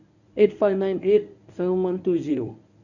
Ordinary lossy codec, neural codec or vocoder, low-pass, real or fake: none; codec, 24 kHz, 0.9 kbps, WavTokenizer, medium speech release version 1; 7.2 kHz; fake